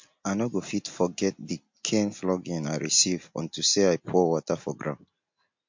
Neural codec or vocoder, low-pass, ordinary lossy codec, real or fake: none; 7.2 kHz; MP3, 48 kbps; real